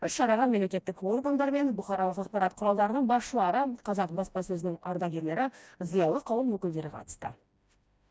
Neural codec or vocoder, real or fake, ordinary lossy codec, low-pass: codec, 16 kHz, 1 kbps, FreqCodec, smaller model; fake; none; none